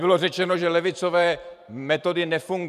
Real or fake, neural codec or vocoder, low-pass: fake; vocoder, 44.1 kHz, 128 mel bands every 512 samples, BigVGAN v2; 14.4 kHz